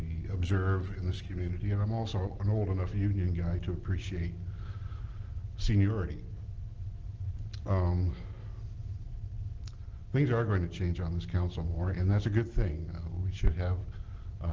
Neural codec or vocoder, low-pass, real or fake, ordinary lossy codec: none; 7.2 kHz; real; Opus, 16 kbps